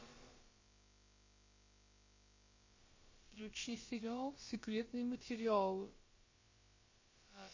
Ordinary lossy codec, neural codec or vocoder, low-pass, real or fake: MP3, 32 kbps; codec, 16 kHz, about 1 kbps, DyCAST, with the encoder's durations; 7.2 kHz; fake